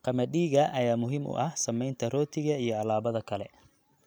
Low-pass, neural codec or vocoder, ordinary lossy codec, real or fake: none; none; none; real